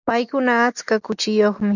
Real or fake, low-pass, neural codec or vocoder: real; 7.2 kHz; none